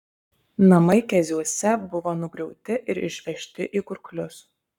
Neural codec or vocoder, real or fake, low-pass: codec, 44.1 kHz, 7.8 kbps, Pupu-Codec; fake; 19.8 kHz